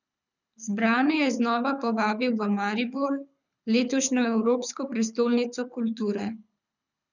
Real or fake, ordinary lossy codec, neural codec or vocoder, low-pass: fake; none; codec, 24 kHz, 6 kbps, HILCodec; 7.2 kHz